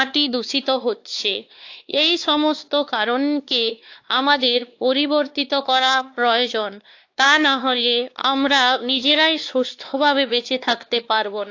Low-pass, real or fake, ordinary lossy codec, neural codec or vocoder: 7.2 kHz; fake; AAC, 48 kbps; codec, 16 kHz, 2 kbps, X-Codec, WavLM features, trained on Multilingual LibriSpeech